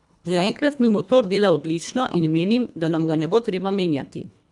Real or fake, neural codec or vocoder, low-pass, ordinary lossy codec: fake; codec, 24 kHz, 1.5 kbps, HILCodec; 10.8 kHz; none